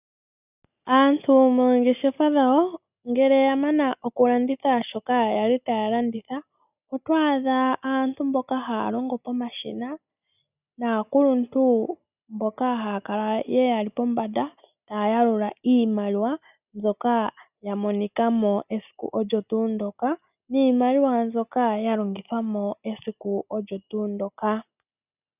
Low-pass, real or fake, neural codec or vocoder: 3.6 kHz; real; none